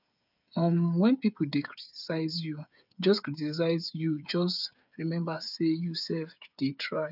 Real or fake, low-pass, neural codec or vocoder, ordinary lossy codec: fake; 5.4 kHz; codec, 16 kHz, 8 kbps, FreqCodec, smaller model; none